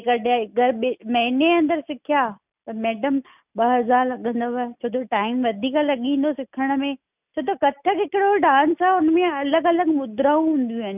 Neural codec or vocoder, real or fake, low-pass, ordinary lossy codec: none; real; 3.6 kHz; none